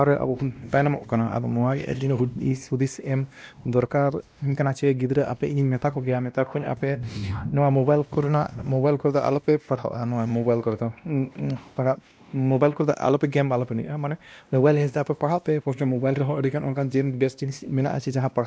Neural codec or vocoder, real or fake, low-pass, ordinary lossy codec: codec, 16 kHz, 1 kbps, X-Codec, WavLM features, trained on Multilingual LibriSpeech; fake; none; none